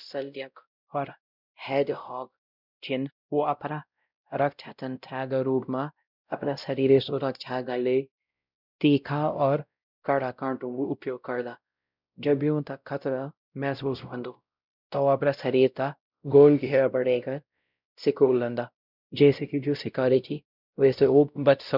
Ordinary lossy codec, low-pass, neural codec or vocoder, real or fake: none; 5.4 kHz; codec, 16 kHz, 0.5 kbps, X-Codec, WavLM features, trained on Multilingual LibriSpeech; fake